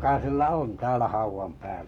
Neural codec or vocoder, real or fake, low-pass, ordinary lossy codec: codec, 44.1 kHz, 7.8 kbps, Pupu-Codec; fake; 19.8 kHz; none